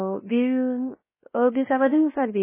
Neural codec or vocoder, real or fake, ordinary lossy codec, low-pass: codec, 16 kHz, 0.7 kbps, FocalCodec; fake; MP3, 16 kbps; 3.6 kHz